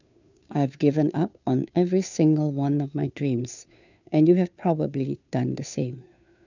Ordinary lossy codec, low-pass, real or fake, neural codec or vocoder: none; 7.2 kHz; fake; codec, 16 kHz, 2 kbps, FunCodec, trained on Chinese and English, 25 frames a second